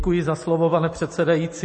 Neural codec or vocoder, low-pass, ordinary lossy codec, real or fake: none; 9.9 kHz; MP3, 32 kbps; real